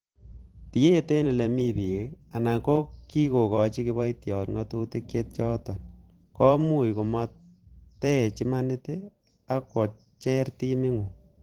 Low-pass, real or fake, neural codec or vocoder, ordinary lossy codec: 19.8 kHz; fake; vocoder, 44.1 kHz, 128 mel bands every 512 samples, BigVGAN v2; Opus, 16 kbps